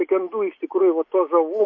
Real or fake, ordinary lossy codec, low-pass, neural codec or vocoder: real; MP3, 32 kbps; 7.2 kHz; none